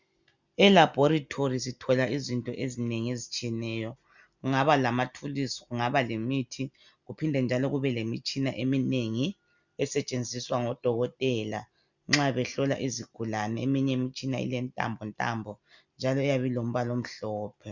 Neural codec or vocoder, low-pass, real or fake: none; 7.2 kHz; real